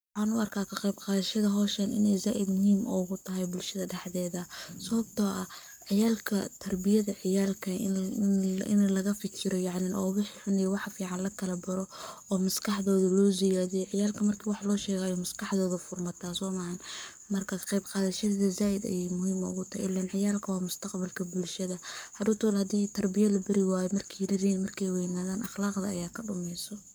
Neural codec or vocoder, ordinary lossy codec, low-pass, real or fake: codec, 44.1 kHz, 7.8 kbps, Pupu-Codec; none; none; fake